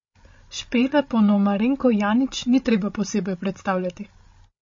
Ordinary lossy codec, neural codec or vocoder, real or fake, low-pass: MP3, 32 kbps; codec, 16 kHz, 16 kbps, FreqCodec, larger model; fake; 7.2 kHz